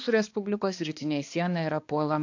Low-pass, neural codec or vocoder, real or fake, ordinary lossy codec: 7.2 kHz; codec, 16 kHz, 2 kbps, X-Codec, HuBERT features, trained on balanced general audio; fake; AAC, 48 kbps